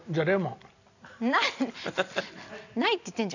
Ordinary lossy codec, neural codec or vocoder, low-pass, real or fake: none; none; 7.2 kHz; real